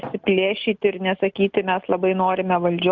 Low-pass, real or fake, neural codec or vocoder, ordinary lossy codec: 7.2 kHz; real; none; Opus, 24 kbps